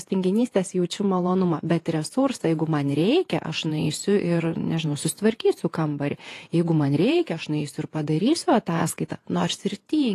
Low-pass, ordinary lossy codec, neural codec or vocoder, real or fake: 14.4 kHz; AAC, 48 kbps; vocoder, 48 kHz, 128 mel bands, Vocos; fake